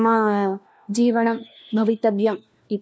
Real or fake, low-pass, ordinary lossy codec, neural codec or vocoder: fake; none; none; codec, 16 kHz, 1 kbps, FunCodec, trained on LibriTTS, 50 frames a second